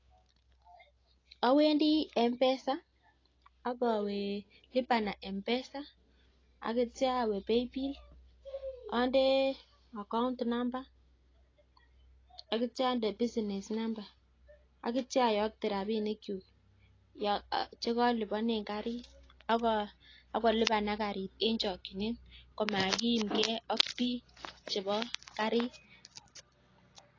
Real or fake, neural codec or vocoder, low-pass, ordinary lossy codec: real; none; 7.2 kHz; AAC, 32 kbps